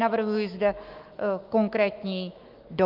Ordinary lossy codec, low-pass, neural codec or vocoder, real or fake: Opus, 32 kbps; 5.4 kHz; autoencoder, 48 kHz, 128 numbers a frame, DAC-VAE, trained on Japanese speech; fake